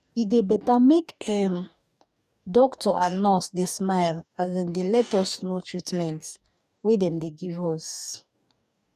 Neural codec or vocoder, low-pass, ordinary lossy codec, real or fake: codec, 44.1 kHz, 2.6 kbps, DAC; 14.4 kHz; none; fake